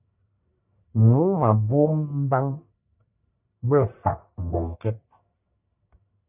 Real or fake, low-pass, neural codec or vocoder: fake; 3.6 kHz; codec, 44.1 kHz, 1.7 kbps, Pupu-Codec